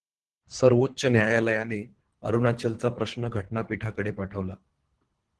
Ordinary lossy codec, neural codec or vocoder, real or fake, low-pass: Opus, 16 kbps; codec, 24 kHz, 3 kbps, HILCodec; fake; 10.8 kHz